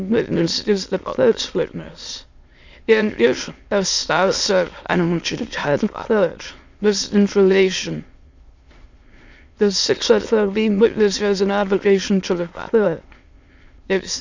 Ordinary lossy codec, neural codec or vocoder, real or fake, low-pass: Opus, 64 kbps; autoencoder, 22.05 kHz, a latent of 192 numbers a frame, VITS, trained on many speakers; fake; 7.2 kHz